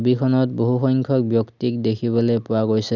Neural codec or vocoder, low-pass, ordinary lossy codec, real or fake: none; 7.2 kHz; none; real